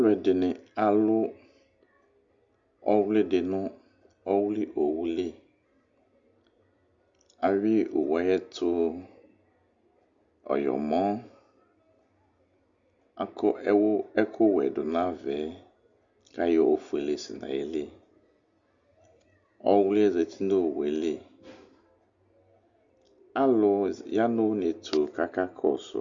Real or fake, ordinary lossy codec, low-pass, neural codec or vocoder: real; Opus, 64 kbps; 7.2 kHz; none